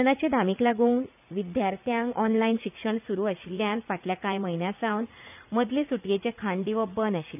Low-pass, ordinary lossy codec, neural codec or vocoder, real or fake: 3.6 kHz; none; vocoder, 44.1 kHz, 80 mel bands, Vocos; fake